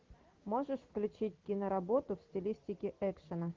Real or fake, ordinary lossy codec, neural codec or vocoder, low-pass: real; Opus, 16 kbps; none; 7.2 kHz